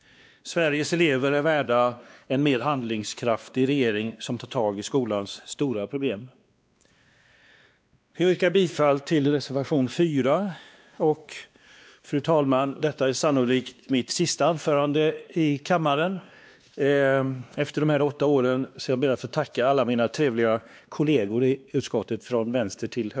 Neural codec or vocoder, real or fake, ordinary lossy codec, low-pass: codec, 16 kHz, 2 kbps, X-Codec, WavLM features, trained on Multilingual LibriSpeech; fake; none; none